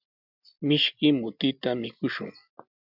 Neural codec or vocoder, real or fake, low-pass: none; real; 5.4 kHz